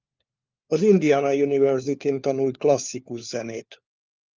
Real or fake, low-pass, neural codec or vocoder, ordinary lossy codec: fake; 7.2 kHz; codec, 16 kHz, 4 kbps, FunCodec, trained on LibriTTS, 50 frames a second; Opus, 24 kbps